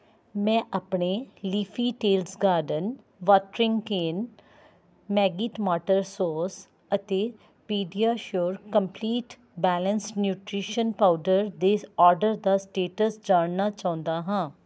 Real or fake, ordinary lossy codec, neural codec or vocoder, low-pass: real; none; none; none